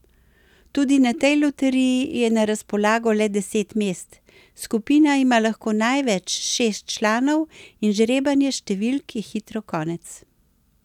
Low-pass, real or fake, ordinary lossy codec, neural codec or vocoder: 19.8 kHz; real; none; none